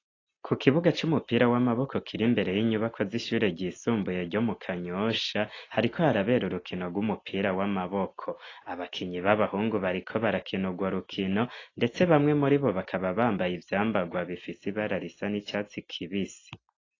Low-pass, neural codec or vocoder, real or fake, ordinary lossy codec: 7.2 kHz; none; real; AAC, 32 kbps